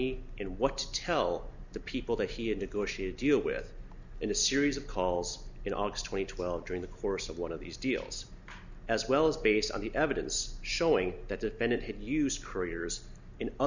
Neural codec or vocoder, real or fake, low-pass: none; real; 7.2 kHz